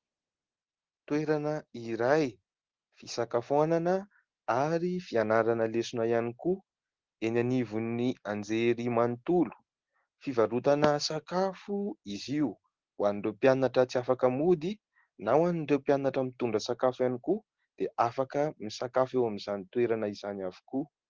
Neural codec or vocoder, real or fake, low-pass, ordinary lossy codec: none; real; 7.2 kHz; Opus, 16 kbps